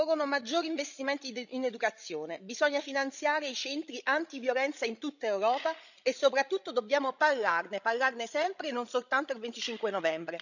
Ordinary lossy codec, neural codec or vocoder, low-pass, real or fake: none; codec, 16 kHz, 16 kbps, FreqCodec, larger model; 7.2 kHz; fake